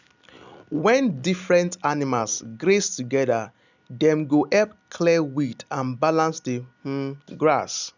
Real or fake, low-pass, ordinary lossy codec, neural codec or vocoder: real; 7.2 kHz; none; none